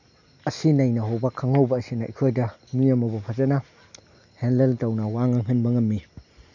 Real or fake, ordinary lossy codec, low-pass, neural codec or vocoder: real; none; 7.2 kHz; none